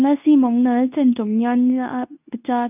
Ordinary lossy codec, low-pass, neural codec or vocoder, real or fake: none; 3.6 kHz; codec, 24 kHz, 0.9 kbps, WavTokenizer, medium speech release version 2; fake